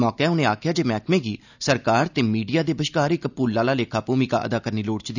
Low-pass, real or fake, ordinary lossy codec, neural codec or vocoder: 7.2 kHz; real; none; none